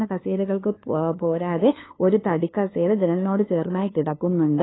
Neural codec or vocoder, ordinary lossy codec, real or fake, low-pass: codec, 16 kHz, 2 kbps, FunCodec, trained on LibriTTS, 25 frames a second; AAC, 16 kbps; fake; 7.2 kHz